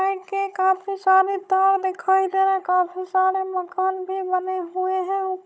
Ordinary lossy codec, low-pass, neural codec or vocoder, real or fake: none; none; codec, 16 kHz, 16 kbps, FunCodec, trained on Chinese and English, 50 frames a second; fake